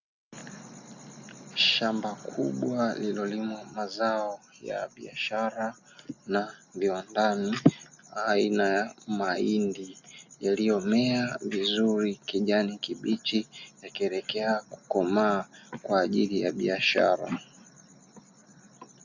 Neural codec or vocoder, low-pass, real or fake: none; 7.2 kHz; real